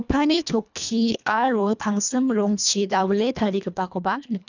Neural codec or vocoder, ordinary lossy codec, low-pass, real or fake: codec, 24 kHz, 1.5 kbps, HILCodec; none; 7.2 kHz; fake